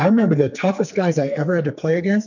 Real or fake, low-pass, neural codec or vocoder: fake; 7.2 kHz; codec, 44.1 kHz, 2.6 kbps, SNAC